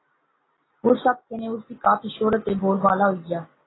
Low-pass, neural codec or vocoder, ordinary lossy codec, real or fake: 7.2 kHz; none; AAC, 16 kbps; real